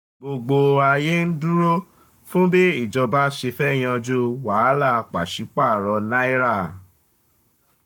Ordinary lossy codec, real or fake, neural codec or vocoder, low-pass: none; fake; codec, 44.1 kHz, 7.8 kbps, Pupu-Codec; 19.8 kHz